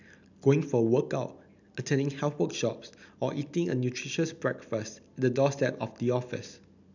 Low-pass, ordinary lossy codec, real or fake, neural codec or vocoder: 7.2 kHz; none; real; none